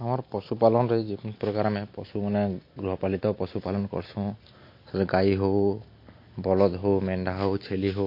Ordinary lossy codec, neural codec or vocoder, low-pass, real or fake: MP3, 32 kbps; none; 5.4 kHz; real